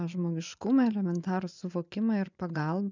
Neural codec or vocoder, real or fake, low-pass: vocoder, 24 kHz, 100 mel bands, Vocos; fake; 7.2 kHz